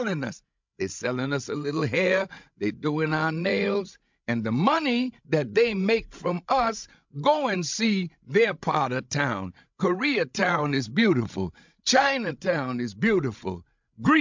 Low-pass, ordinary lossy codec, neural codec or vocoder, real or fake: 7.2 kHz; MP3, 64 kbps; codec, 16 kHz, 16 kbps, FreqCodec, larger model; fake